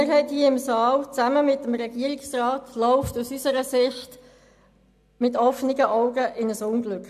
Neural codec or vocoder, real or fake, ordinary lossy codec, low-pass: none; real; AAC, 96 kbps; 14.4 kHz